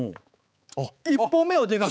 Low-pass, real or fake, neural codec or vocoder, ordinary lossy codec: none; fake; codec, 16 kHz, 4 kbps, X-Codec, HuBERT features, trained on balanced general audio; none